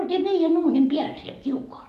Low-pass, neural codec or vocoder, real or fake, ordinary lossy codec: 14.4 kHz; codec, 44.1 kHz, 7.8 kbps, DAC; fake; none